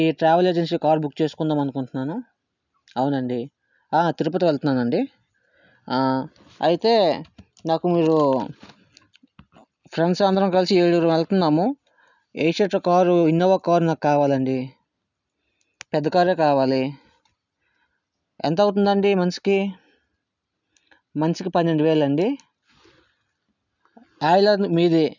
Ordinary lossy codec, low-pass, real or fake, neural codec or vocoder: none; 7.2 kHz; real; none